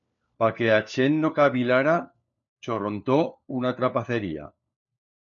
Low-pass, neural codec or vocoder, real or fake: 7.2 kHz; codec, 16 kHz, 4 kbps, FunCodec, trained on LibriTTS, 50 frames a second; fake